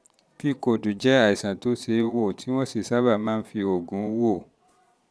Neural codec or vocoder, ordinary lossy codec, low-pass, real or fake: vocoder, 22.05 kHz, 80 mel bands, Vocos; none; none; fake